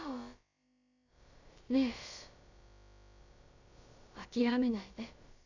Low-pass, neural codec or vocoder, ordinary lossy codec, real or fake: 7.2 kHz; codec, 16 kHz, about 1 kbps, DyCAST, with the encoder's durations; none; fake